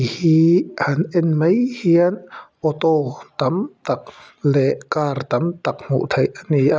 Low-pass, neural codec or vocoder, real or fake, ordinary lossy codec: none; none; real; none